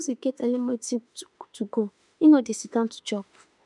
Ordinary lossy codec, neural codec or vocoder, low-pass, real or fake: none; autoencoder, 48 kHz, 32 numbers a frame, DAC-VAE, trained on Japanese speech; 10.8 kHz; fake